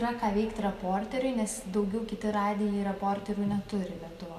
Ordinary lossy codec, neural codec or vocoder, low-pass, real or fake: MP3, 96 kbps; none; 14.4 kHz; real